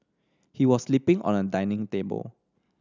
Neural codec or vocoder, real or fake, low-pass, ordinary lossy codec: none; real; 7.2 kHz; none